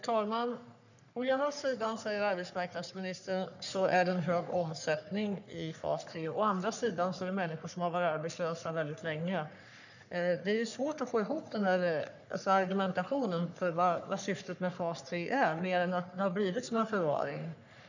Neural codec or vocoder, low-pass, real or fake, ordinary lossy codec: codec, 44.1 kHz, 3.4 kbps, Pupu-Codec; 7.2 kHz; fake; none